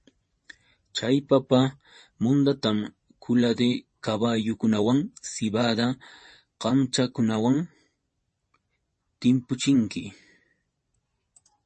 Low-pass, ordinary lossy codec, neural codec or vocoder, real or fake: 10.8 kHz; MP3, 32 kbps; vocoder, 24 kHz, 100 mel bands, Vocos; fake